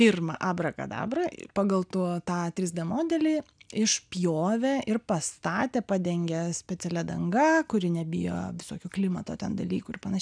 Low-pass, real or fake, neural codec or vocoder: 9.9 kHz; real; none